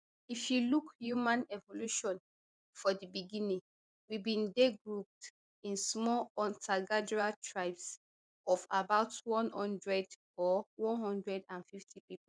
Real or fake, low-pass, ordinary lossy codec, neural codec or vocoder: fake; 9.9 kHz; none; vocoder, 24 kHz, 100 mel bands, Vocos